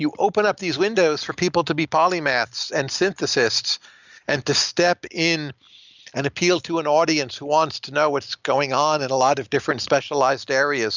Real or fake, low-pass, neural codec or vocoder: real; 7.2 kHz; none